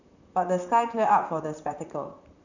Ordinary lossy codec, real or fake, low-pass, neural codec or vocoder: none; fake; 7.2 kHz; vocoder, 44.1 kHz, 128 mel bands, Pupu-Vocoder